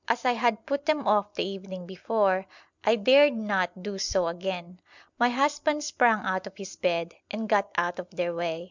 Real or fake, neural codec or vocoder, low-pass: real; none; 7.2 kHz